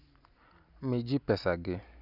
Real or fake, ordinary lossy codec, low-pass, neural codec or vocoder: real; none; 5.4 kHz; none